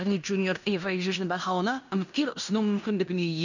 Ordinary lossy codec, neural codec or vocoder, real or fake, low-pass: none; codec, 16 kHz in and 24 kHz out, 0.9 kbps, LongCat-Audio-Codec, fine tuned four codebook decoder; fake; 7.2 kHz